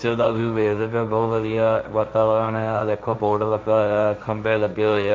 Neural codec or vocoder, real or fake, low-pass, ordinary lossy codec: codec, 16 kHz, 1.1 kbps, Voila-Tokenizer; fake; none; none